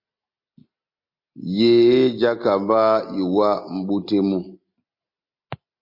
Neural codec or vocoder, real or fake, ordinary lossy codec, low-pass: none; real; MP3, 32 kbps; 5.4 kHz